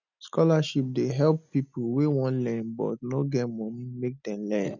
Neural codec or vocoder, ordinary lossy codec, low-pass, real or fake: none; none; 7.2 kHz; real